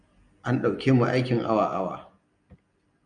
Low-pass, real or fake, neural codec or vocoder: 9.9 kHz; real; none